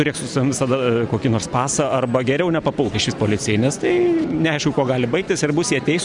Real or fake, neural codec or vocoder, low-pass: real; none; 10.8 kHz